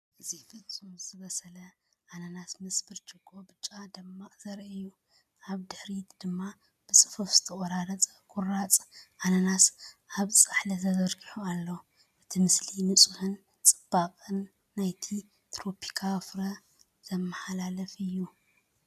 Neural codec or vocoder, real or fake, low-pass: none; real; 14.4 kHz